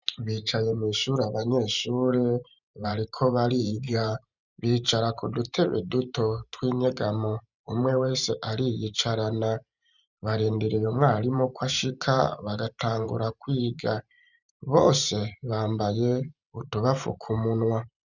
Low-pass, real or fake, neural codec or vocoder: 7.2 kHz; fake; vocoder, 44.1 kHz, 128 mel bands every 512 samples, BigVGAN v2